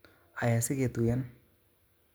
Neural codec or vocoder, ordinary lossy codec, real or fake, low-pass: none; none; real; none